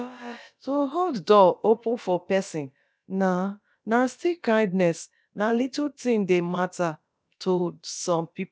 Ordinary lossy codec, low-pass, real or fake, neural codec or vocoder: none; none; fake; codec, 16 kHz, about 1 kbps, DyCAST, with the encoder's durations